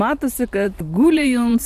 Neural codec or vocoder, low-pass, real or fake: vocoder, 44.1 kHz, 128 mel bands every 512 samples, BigVGAN v2; 14.4 kHz; fake